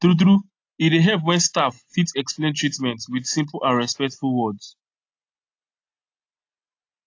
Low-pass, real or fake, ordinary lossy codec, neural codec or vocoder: 7.2 kHz; real; AAC, 48 kbps; none